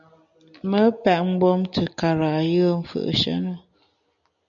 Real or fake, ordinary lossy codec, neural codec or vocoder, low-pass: real; MP3, 96 kbps; none; 7.2 kHz